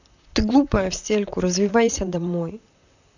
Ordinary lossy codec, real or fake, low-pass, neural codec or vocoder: none; fake; 7.2 kHz; vocoder, 44.1 kHz, 128 mel bands, Pupu-Vocoder